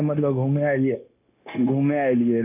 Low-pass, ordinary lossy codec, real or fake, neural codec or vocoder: 3.6 kHz; none; fake; codec, 16 kHz, 0.9 kbps, LongCat-Audio-Codec